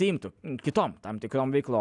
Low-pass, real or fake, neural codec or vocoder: 10.8 kHz; real; none